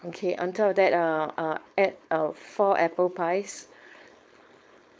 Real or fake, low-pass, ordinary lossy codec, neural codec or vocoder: fake; none; none; codec, 16 kHz, 4.8 kbps, FACodec